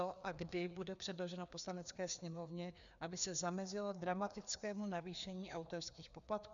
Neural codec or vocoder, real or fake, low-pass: codec, 16 kHz, 2 kbps, FreqCodec, larger model; fake; 7.2 kHz